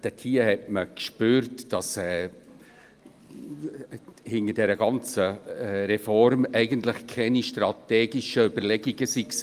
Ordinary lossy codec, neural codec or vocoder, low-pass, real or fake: Opus, 32 kbps; none; 14.4 kHz; real